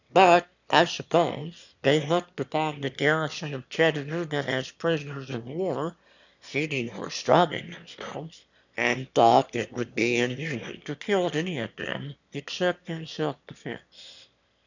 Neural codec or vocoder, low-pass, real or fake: autoencoder, 22.05 kHz, a latent of 192 numbers a frame, VITS, trained on one speaker; 7.2 kHz; fake